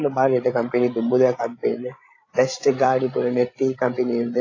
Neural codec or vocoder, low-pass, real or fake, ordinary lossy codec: codec, 16 kHz, 16 kbps, FreqCodec, larger model; 7.2 kHz; fake; AAC, 32 kbps